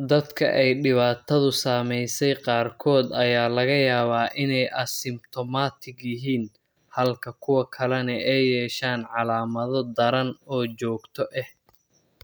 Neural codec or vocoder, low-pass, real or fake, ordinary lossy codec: none; none; real; none